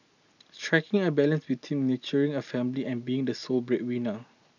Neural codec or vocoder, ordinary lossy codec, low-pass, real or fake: none; none; 7.2 kHz; real